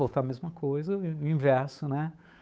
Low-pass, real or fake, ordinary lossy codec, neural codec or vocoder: none; fake; none; codec, 16 kHz, 8 kbps, FunCodec, trained on Chinese and English, 25 frames a second